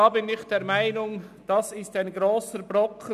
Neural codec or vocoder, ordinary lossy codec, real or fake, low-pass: none; none; real; 14.4 kHz